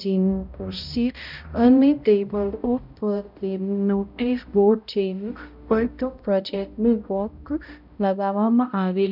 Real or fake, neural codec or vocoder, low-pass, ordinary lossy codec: fake; codec, 16 kHz, 0.5 kbps, X-Codec, HuBERT features, trained on balanced general audio; 5.4 kHz; none